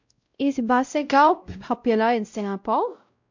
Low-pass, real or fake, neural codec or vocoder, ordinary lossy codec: 7.2 kHz; fake; codec, 16 kHz, 0.5 kbps, X-Codec, WavLM features, trained on Multilingual LibriSpeech; MP3, 64 kbps